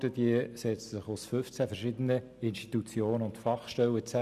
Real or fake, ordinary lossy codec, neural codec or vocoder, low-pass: real; none; none; 14.4 kHz